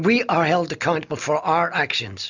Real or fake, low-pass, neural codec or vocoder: real; 7.2 kHz; none